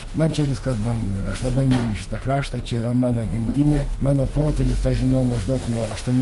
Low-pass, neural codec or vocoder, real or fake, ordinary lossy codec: 14.4 kHz; autoencoder, 48 kHz, 32 numbers a frame, DAC-VAE, trained on Japanese speech; fake; MP3, 48 kbps